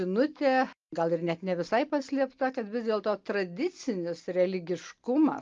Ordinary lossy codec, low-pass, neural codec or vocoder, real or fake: Opus, 32 kbps; 7.2 kHz; none; real